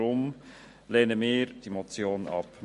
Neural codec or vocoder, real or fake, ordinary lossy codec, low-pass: none; real; MP3, 48 kbps; 14.4 kHz